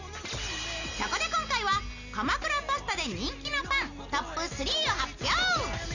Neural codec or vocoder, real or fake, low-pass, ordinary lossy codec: none; real; 7.2 kHz; none